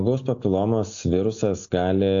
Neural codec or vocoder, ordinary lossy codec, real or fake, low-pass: none; AAC, 64 kbps; real; 7.2 kHz